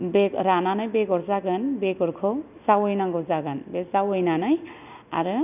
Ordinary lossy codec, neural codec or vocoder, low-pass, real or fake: none; none; 3.6 kHz; real